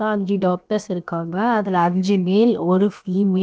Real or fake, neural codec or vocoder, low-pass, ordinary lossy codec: fake; codec, 16 kHz, 0.7 kbps, FocalCodec; none; none